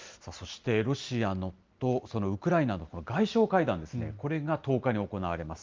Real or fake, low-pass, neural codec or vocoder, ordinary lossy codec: real; 7.2 kHz; none; Opus, 32 kbps